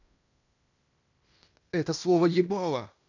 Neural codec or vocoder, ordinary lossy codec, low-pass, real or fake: codec, 16 kHz in and 24 kHz out, 0.9 kbps, LongCat-Audio-Codec, fine tuned four codebook decoder; none; 7.2 kHz; fake